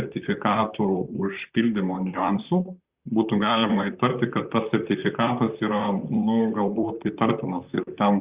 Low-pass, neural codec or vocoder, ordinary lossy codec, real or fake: 3.6 kHz; vocoder, 44.1 kHz, 128 mel bands, Pupu-Vocoder; Opus, 64 kbps; fake